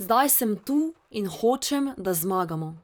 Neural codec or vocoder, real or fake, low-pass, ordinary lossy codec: vocoder, 44.1 kHz, 128 mel bands, Pupu-Vocoder; fake; none; none